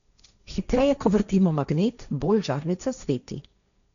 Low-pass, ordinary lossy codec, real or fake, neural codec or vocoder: 7.2 kHz; none; fake; codec, 16 kHz, 1.1 kbps, Voila-Tokenizer